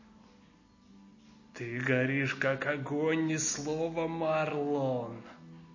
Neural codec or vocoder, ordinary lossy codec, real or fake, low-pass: none; MP3, 32 kbps; real; 7.2 kHz